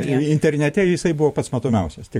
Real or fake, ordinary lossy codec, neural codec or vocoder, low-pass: fake; MP3, 64 kbps; vocoder, 44.1 kHz, 128 mel bands every 512 samples, BigVGAN v2; 19.8 kHz